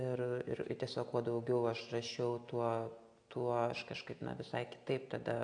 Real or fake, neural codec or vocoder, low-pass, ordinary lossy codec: real; none; 9.9 kHz; AAC, 48 kbps